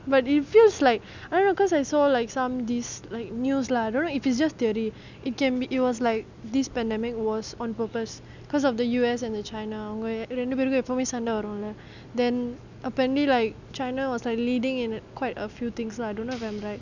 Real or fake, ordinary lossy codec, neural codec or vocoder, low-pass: real; none; none; 7.2 kHz